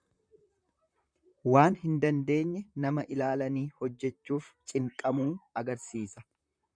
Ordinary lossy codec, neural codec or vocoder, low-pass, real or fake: MP3, 96 kbps; vocoder, 44.1 kHz, 128 mel bands, Pupu-Vocoder; 9.9 kHz; fake